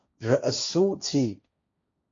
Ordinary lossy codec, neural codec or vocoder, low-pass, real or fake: AAC, 48 kbps; codec, 16 kHz, 1.1 kbps, Voila-Tokenizer; 7.2 kHz; fake